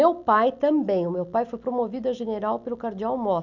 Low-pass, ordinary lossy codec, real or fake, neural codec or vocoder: 7.2 kHz; none; real; none